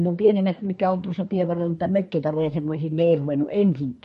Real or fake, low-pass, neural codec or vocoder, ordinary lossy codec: fake; 10.8 kHz; codec, 24 kHz, 1 kbps, SNAC; MP3, 48 kbps